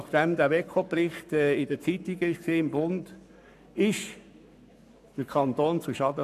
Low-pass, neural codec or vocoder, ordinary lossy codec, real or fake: 14.4 kHz; codec, 44.1 kHz, 7.8 kbps, Pupu-Codec; none; fake